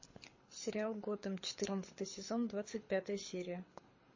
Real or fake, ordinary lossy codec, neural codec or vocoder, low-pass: fake; MP3, 32 kbps; codec, 24 kHz, 6 kbps, HILCodec; 7.2 kHz